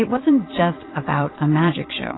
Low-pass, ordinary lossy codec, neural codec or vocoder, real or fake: 7.2 kHz; AAC, 16 kbps; vocoder, 22.05 kHz, 80 mel bands, Vocos; fake